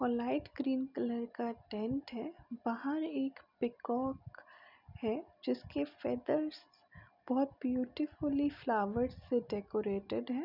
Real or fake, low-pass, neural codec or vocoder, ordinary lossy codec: real; 5.4 kHz; none; none